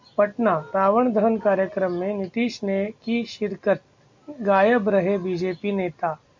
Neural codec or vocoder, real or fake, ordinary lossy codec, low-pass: none; real; MP3, 48 kbps; 7.2 kHz